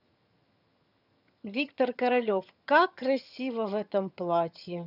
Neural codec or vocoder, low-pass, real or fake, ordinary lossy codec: vocoder, 22.05 kHz, 80 mel bands, HiFi-GAN; 5.4 kHz; fake; none